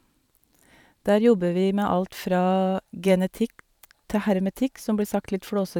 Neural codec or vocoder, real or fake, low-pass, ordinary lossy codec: vocoder, 44.1 kHz, 128 mel bands every 512 samples, BigVGAN v2; fake; 19.8 kHz; none